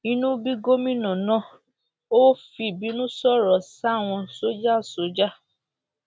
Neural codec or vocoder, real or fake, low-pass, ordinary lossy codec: none; real; none; none